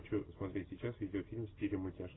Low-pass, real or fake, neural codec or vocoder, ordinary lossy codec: 7.2 kHz; real; none; AAC, 16 kbps